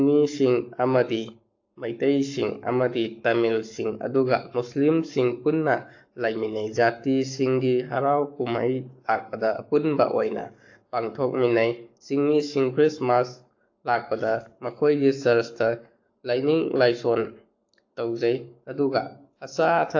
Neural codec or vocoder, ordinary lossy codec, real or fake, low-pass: codec, 44.1 kHz, 7.8 kbps, Pupu-Codec; AAC, 48 kbps; fake; 7.2 kHz